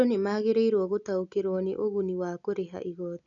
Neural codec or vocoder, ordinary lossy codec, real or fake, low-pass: none; none; real; 7.2 kHz